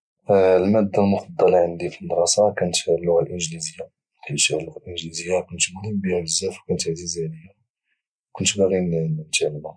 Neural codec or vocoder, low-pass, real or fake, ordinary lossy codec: none; 9.9 kHz; real; none